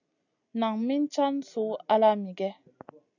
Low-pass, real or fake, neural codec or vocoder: 7.2 kHz; real; none